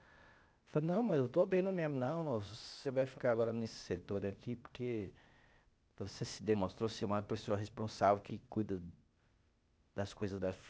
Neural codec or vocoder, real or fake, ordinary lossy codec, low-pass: codec, 16 kHz, 0.8 kbps, ZipCodec; fake; none; none